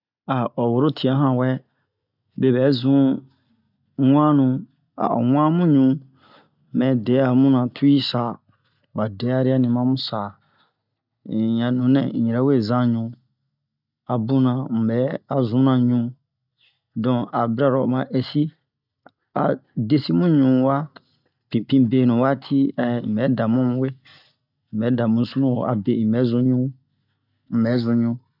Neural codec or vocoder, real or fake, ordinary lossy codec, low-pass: none; real; none; 5.4 kHz